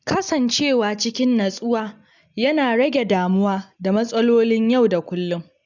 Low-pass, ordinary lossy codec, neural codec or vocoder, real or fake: 7.2 kHz; none; none; real